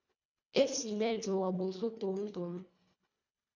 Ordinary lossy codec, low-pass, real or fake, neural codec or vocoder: MP3, 64 kbps; 7.2 kHz; fake; codec, 24 kHz, 1.5 kbps, HILCodec